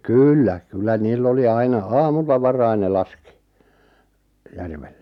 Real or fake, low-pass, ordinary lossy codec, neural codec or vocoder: real; 19.8 kHz; none; none